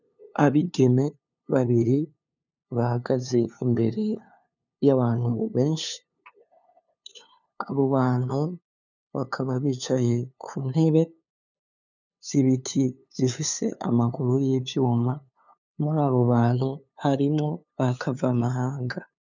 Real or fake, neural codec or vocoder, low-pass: fake; codec, 16 kHz, 2 kbps, FunCodec, trained on LibriTTS, 25 frames a second; 7.2 kHz